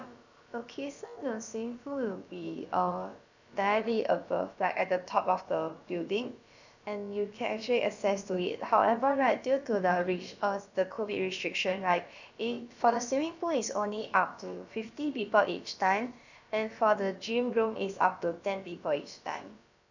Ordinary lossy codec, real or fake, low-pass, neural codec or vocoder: none; fake; 7.2 kHz; codec, 16 kHz, about 1 kbps, DyCAST, with the encoder's durations